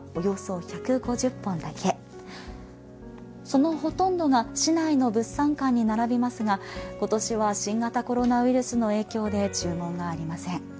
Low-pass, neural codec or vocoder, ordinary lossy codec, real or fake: none; none; none; real